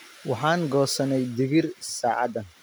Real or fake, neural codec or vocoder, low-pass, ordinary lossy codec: real; none; none; none